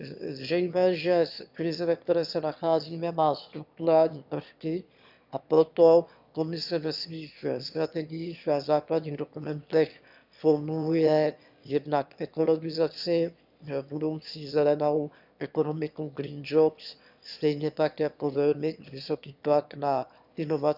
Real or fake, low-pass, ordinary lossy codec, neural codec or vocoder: fake; 5.4 kHz; none; autoencoder, 22.05 kHz, a latent of 192 numbers a frame, VITS, trained on one speaker